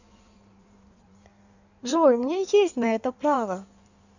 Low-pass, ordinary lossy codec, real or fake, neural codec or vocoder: 7.2 kHz; none; fake; codec, 16 kHz in and 24 kHz out, 1.1 kbps, FireRedTTS-2 codec